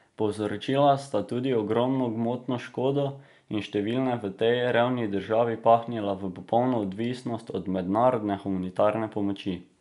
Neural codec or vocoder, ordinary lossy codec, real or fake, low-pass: none; none; real; 10.8 kHz